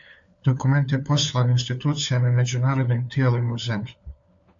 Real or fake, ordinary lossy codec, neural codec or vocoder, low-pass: fake; AAC, 64 kbps; codec, 16 kHz, 4 kbps, FunCodec, trained on LibriTTS, 50 frames a second; 7.2 kHz